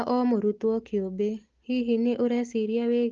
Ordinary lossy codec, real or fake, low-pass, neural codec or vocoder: Opus, 16 kbps; real; 7.2 kHz; none